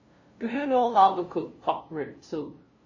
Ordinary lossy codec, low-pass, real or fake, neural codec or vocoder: MP3, 32 kbps; 7.2 kHz; fake; codec, 16 kHz, 0.5 kbps, FunCodec, trained on LibriTTS, 25 frames a second